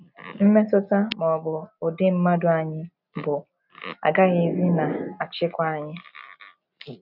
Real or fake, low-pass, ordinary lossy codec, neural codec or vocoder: real; 5.4 kHz; none; none